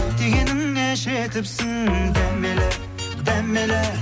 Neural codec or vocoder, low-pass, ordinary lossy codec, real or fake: none; none; none; real